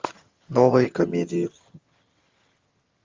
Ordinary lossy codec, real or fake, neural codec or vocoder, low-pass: Opus, 32 kbps; fake; vocoder, 22.05 kHz, 80 mel bands, HiFi-GAN; 7.2 kHz